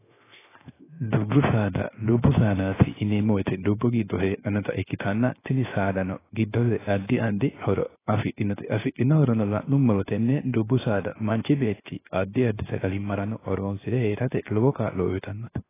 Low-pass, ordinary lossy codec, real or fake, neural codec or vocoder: 3.6 kHz; AAC, 16 kbps; fake; codec, 16 kHz, 0.7 kbps, FocalCodec